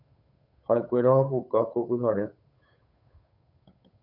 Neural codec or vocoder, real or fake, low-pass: codec, 16 kHz, 8 kbps, FunCodec, trained on Chinese and English, 25 frames a second; fake; 5.4 kHz